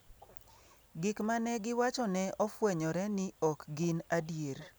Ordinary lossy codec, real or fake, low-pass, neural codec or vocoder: none; real; none; none